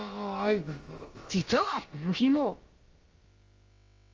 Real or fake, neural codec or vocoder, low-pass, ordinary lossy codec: fake; codec, 16 kHz, about 1 kbps, DyCAST, with the encoder's durations; 7.2 kHz; Opus, 32 kbps